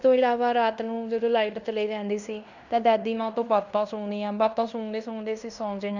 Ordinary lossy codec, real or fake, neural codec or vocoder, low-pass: none; fake; codec, 16 kHz in and 24 kHz out, 0.9 kbps, LongCat-Audio-Codec, fine tuned four codebook decoder; 7.2 kHz